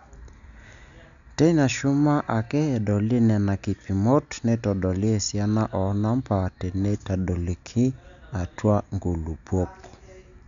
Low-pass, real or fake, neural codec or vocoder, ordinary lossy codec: 7.2 kHz; real; none; none